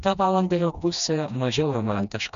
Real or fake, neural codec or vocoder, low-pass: fake; codec, 16 kHz, 1 kbps, FreqCodec, smaller model; 7.2 kHz